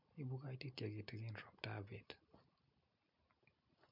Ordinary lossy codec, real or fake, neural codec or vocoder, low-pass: MP3, 48 kbps; real; none; 5.4 kHz